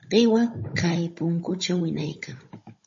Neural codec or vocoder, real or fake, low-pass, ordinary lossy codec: codec, 16 kHz, 16 kbps, FunCodec, trained on Chinese and English, 50 frames a second; fake; 7.2 kHz; MP3, 32 kbps